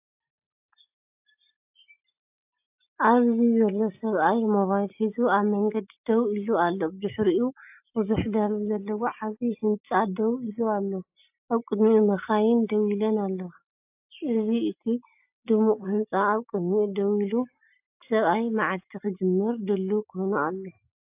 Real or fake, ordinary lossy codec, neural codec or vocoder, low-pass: real; AAC, 32 kbps; none; 3.6 kHz